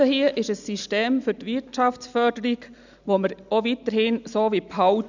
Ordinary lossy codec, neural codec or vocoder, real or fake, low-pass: none; none; real; 7.2 kHz